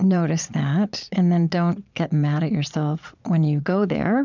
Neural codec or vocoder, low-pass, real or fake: vocoder, 22.05 kHz, 80 mel bands, Vocos; 7.2 kHz; fake